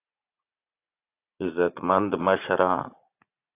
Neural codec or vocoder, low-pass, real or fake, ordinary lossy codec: vocoder, 44.1 kHz, 80 mel bands, Vocos; 3.6 kHz; fake; AAC, 24 kbps